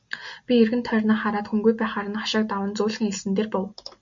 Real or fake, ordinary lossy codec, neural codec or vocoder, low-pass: real; MP3, 48 kbps; none; 7.2 kHz